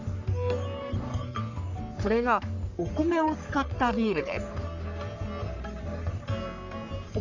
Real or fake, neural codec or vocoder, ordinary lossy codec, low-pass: fake; codec, 44.1 kHz, 3.4 kbps, Pupu-Codec; none; 7.2 kHz